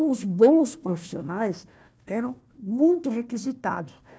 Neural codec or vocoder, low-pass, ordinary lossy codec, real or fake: codec, 16 kHz, 1 kbps, FunCodec, trained on Chinese and English, 50 frames a second; none; none; fake